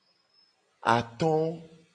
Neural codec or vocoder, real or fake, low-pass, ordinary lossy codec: none; real; 9.9 kHz; MP3, 64 kbps